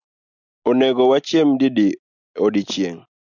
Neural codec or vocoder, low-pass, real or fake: none; 7.2 kHz; real